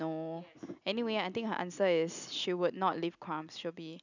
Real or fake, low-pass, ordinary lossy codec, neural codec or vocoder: real; 7.2 kHz; none; none